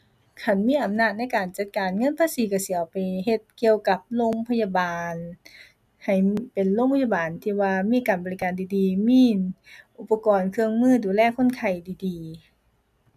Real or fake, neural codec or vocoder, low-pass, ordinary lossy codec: real; none; 14.4 kHz; none